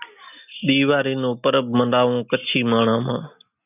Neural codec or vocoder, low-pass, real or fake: none; 3.6 kHz; real